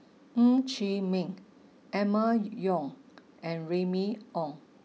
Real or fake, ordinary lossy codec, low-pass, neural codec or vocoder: real; none; none; none